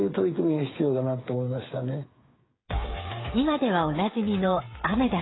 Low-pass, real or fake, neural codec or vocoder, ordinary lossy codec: 7.2 kHz; fake; codec, 16 kHz, 8 kbps, FreqCodec, smaller model; AAC, 16 kbps